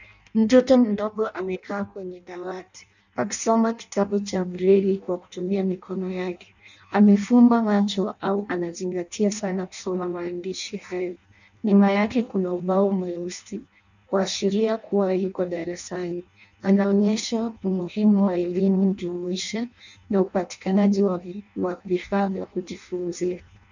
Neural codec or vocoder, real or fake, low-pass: codec, 16 kHz in and 24 kHz out, 0.6 kbps, FireRedTTS-2 codec; fake; 7.2 kHz